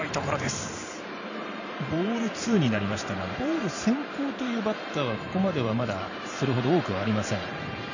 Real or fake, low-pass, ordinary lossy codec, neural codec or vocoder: fake; 7.2 kHz; none; vocoder, 44.1 kHz, 128 mel bands every 512 samples, BigVGAN v2